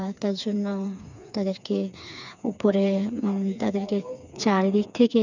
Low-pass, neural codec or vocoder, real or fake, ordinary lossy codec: 7.2 kHz; codec, 16 kHz, 4 kbps, FreqCodec, smaller model; fake; none